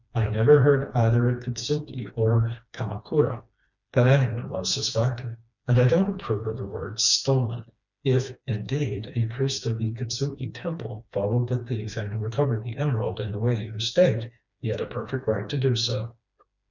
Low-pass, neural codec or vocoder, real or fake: 7.2 kHz; codec, 16 kHz, 2 kbps, FreqCodec, smaller model; fake